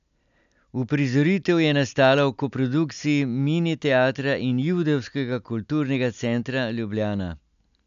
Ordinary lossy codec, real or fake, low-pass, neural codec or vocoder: AAC, 96 kbps; real; 7.2 kHz; none